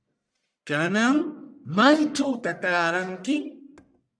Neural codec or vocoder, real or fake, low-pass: codec, 44.1 kHz, 1.7 kbps, Pupu-Codec; fake; 9.9 kHz